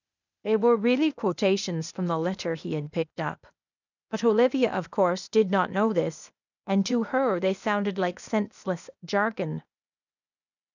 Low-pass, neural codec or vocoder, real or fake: 7.2 kHz; codec, 16 kHz, 0.8 kbps, ZipCodec; fake